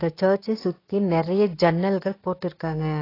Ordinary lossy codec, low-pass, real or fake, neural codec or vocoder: AAC, 24 kbps; 5.4 kHz; fake; codec, 16 kHz, 6 kbps, DAC